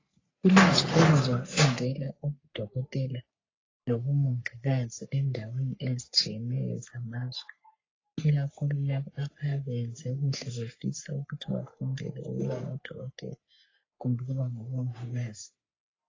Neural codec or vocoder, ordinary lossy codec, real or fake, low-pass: codec, 44.1 kHz, 3.4 kbps, Pupu-Codec; AAC, 32 kbps; fake; 7.2 kHz